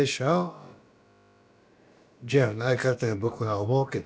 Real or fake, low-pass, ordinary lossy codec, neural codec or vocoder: fake; none; none; codec, 16 kHz, about 1 kbps, DyCAST, with the encoder's durations